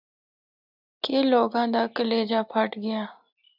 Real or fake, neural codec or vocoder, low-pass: real; none; 5.4 kHz